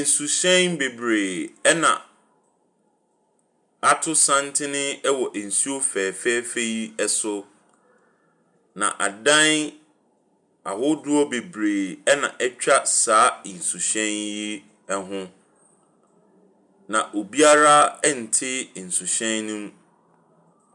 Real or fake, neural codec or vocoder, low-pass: real; none; 10.8 kHz